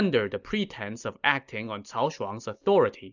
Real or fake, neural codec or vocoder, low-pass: real; none; 7.2 kHz